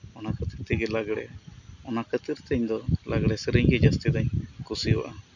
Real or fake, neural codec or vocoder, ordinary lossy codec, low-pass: real; none; none; 7.2 kHz